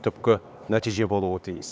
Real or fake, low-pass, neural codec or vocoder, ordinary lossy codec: fake; none; codec, 16 kHz, 4 kbps, X-Codec, HuBERT features, trained on LibriSpeech; none